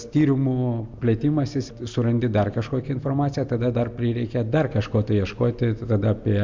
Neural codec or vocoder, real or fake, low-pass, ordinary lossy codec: none; real; 7.2 kHz; MP3, 64 kbps